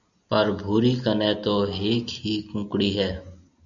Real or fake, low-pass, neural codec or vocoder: real; 7.2 kHz; none